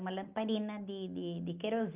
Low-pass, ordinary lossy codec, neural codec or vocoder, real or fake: 3.6 kHz; Opus, 24 kbps; none; real